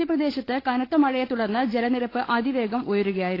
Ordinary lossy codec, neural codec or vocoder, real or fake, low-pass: AAC, 24 kbps; codec, 16 kHz, 16 kbps, FunCodec, trained on LibriTTS, 50 frames a second; fake; 5.4 kHz